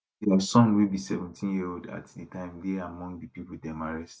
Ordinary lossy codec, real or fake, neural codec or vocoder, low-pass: none; real; none; none